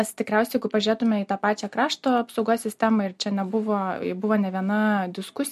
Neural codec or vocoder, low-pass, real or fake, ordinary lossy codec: none; 14.4 kHz; real; MP3, 64 kbps